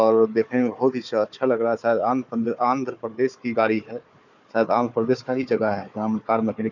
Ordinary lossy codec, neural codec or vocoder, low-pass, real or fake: none; codec, 16 kHz, 4 kbps, FunCodec, trained on Chinese and English, 50 frames a second; 7.2 kHz; fake